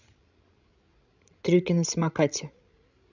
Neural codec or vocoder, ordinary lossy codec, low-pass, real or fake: codec, 16 kHz, 16 kbps, FreqCodec, larger model; none; 7.2 kHz; fake